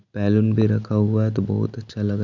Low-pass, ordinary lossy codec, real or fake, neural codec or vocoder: 7.2 kHz; none; real; none